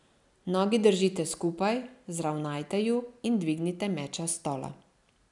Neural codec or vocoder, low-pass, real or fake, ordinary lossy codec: none; 10.8 kHz; real; none